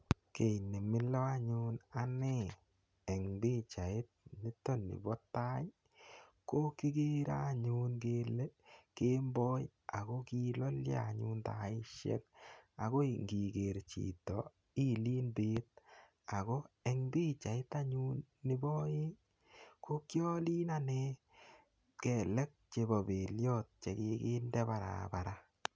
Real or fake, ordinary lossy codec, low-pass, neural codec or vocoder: real; none; none; none